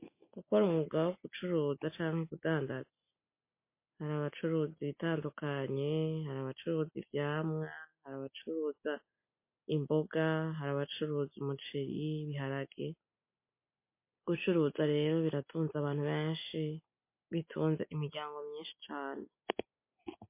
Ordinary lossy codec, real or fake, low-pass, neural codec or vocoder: MP3, 24 kbps; real; 3.6 kHz; none